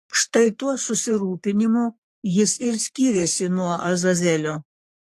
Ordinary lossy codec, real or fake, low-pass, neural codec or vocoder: AAC, 64 kbps; fake; 14.4 kHz; codec, 44.1 kHz, 3.4 kbps, Pupu-Codec